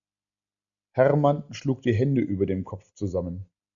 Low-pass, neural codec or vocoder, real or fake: 7.2 kHz; none; real